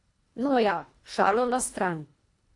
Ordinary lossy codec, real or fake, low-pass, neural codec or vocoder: AAC, 48 kbps; fake; 10.8 kHz; codec, 24 kHz, 1.5 kbps, HILCodec